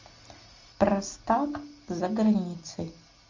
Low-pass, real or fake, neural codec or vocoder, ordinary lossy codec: 7.2 kHz; real; none; MP3, 48 kbps